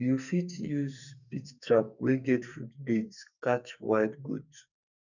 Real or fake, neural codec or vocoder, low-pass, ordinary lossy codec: fake; codec, 44.1 kHz, 2.6 kbps, SNAC; 7.2 kHz; none